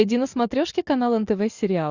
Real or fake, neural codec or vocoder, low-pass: real; none; 7.2 kHz